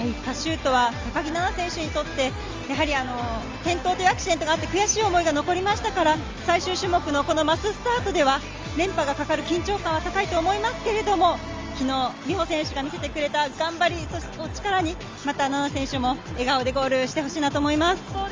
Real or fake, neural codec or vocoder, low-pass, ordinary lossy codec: real; none; 7.2 kHz; Opus, 32 kbps